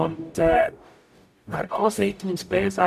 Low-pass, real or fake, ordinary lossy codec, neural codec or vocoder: 14.4 kHz; fake; none; codec, 44.1 kHz, 0.9 kbps, DAC